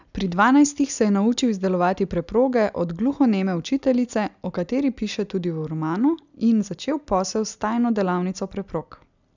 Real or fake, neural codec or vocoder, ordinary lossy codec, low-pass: real; none; none; 7.2 kHz